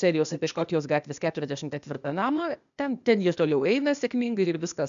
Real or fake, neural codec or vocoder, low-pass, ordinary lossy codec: fake; codec, 16 kHz, 0.8 kbps, ZipCodec; 7.2 kHz; MP3, 96 kbps